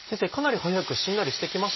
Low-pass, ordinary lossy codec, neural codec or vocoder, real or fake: 7.2 kHz; MP3, 24 kbps; none; real